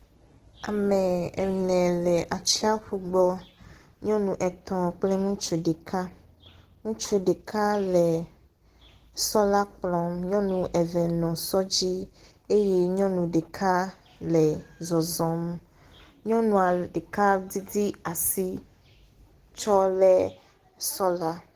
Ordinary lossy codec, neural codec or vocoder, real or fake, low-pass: Opus, 16 kbps; none; real; 14.4 kHz